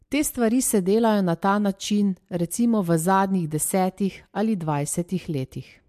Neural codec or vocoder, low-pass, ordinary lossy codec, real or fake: none; 14.4 kHz; MP3, 64 kbps; real